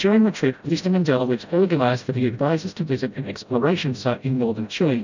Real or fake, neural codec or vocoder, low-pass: fake; codec, 16 kHz, 0.5 kbps, FreqCodec, smaller model; 7.2 kHz